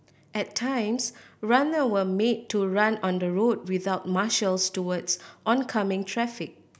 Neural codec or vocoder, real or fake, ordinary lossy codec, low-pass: none; real; none; none